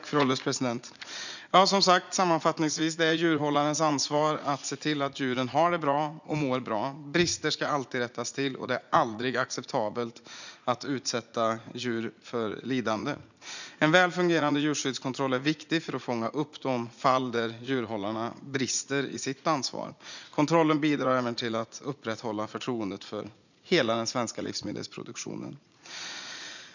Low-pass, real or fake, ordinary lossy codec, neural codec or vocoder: 7.2 kHz; fake; none; vocoder, 44.1 kHz, 80 mel bands, Vocos